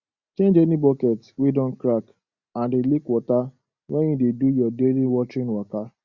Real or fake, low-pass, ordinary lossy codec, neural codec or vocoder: real; 7.2 kHz; Opus, 64 kbps; none